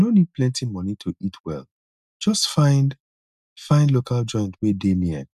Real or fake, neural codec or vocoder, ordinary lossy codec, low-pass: real; none; none; 14.4 kHz